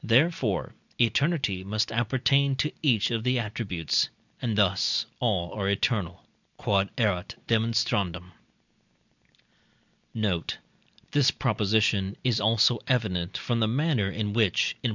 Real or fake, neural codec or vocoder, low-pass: real; none; 7.2 kHz